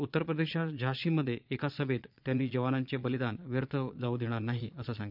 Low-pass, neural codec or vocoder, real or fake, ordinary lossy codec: 5.4 kHz; vocoder, 22.05 kHz, 80 mel bands, Vocos; fake; none